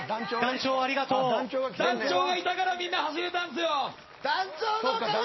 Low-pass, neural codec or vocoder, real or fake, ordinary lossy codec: 7.2 kHz; none; real; MP3, 24 kbps